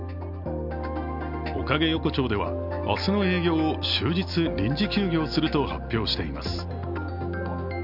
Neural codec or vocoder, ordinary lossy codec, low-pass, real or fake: none; none; 5.4 kHz; real